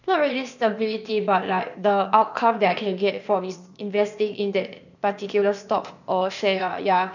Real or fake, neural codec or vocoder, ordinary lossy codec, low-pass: fake; codec, 16 kHz, 0.8 kbps, ZipCodec; none; 7.2 kHz